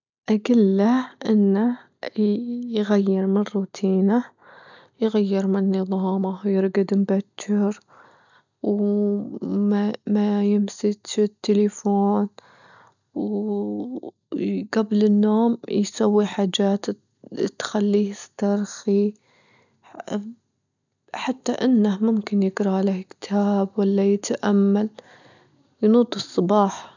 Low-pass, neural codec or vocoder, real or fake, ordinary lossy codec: 7.2 kHz; none; real; none